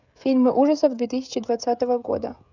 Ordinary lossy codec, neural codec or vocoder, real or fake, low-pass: none; codec, 16 kHz, 16 kbps, FreqCodec, smaller model; fake; 7.2 kHz